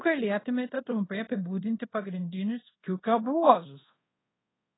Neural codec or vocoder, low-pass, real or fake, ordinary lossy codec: codec, 24 kHz, 0.5 kbps, DualCodec; 7.2 kHz; fake; AAC, 16 kbps